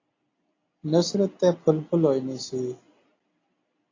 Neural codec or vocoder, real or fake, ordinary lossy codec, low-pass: none; real; AAC, 32 kbps; 7.2 kHz